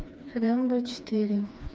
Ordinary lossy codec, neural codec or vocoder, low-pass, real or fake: none; codec, 16 kHz, 2 kbps, FreqCodec, smaller model; none; fake